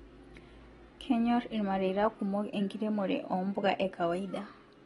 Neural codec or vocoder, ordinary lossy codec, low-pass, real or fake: none; AAC, 32 kbps; 10.8 kHz; real